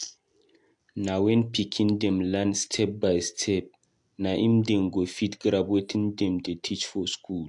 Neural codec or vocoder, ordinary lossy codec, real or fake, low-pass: none; AAC, 64 kbps; real; 10.8 kHz